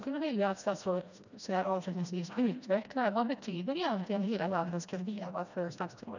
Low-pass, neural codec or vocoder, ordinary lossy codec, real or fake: 7.2 kHz; codec, 16 kHz, 1 kbps, FreqCodec, smaller model; none; fake